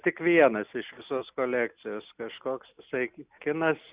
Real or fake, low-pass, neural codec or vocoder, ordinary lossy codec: real; 3.6 kHz; none; Opus, 64 kbps